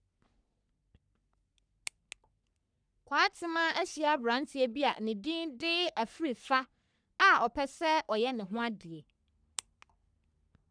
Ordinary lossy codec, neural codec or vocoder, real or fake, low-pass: none; codec, 44.1 kHz, 3.4 kbps, Pupu-Codec; fake; 9.9 kHz